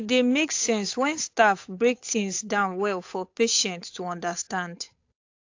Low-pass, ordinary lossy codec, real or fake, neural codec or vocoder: 7.2 kHz; AAC, 48 kbps; fake; codec, 16 kHz, 8 kbps, FunCodec, trained on Chinese and English, 25 frames a second